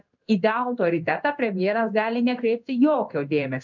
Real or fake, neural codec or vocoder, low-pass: fake; codec, 16 kHz in and 24 kHz out, 1 kbps, XY-Tokenizer; 7.2 kHz